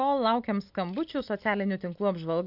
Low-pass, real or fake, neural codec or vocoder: 5.4 kHz; real; none